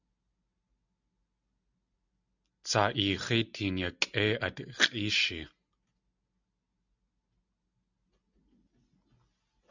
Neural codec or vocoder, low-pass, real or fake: none; 7.2 kHz; real